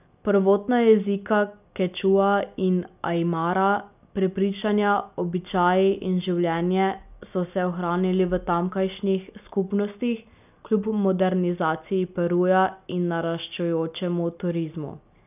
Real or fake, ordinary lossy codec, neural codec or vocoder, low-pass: real; none; none; 3.6 kHz